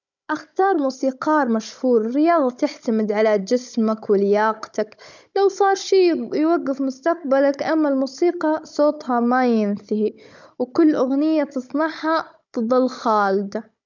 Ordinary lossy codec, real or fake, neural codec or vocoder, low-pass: none; fake; codec, 16 kHz, 16 kbps, FunCodec, trained on Chinese and English, 50 frames a second; 7.2 kHz